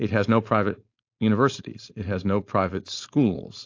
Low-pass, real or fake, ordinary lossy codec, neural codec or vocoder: 7.2 kHz; fake; AAC, 48 kbps; codec, 16 kHz, 4.8 kbps, FACodec